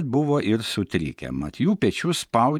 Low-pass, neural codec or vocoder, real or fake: 19.8 kHz; vocoder, 48 kHz, 128 mel bands, Vocos; fake